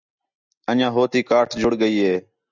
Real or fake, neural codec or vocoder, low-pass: real; none; 7.2 kHz